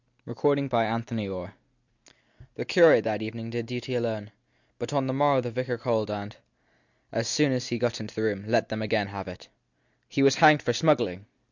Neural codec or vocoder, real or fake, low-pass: none; real; 7.2 kHz